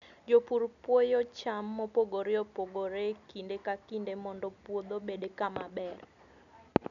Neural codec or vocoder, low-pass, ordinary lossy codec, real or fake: none; 7.2 kHz; none; real